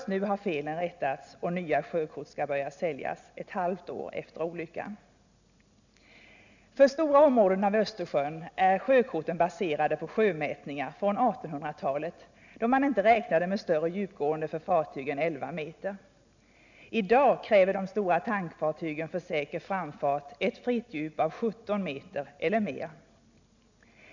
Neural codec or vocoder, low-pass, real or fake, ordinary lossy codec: none; 7.2 kHz; real; none